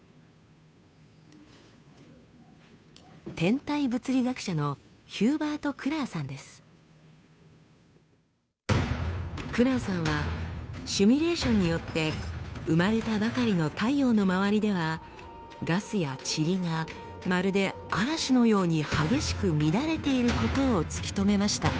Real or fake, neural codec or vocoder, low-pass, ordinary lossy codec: fake; codec, 16 kHz, 2 kbps, FunCodec, trained on Chinese and English, 25 frames a second; none; none